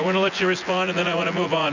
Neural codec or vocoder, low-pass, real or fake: vocoder, 24 kHz, 100 mel bands, Vocos; 7.2 kHz; fake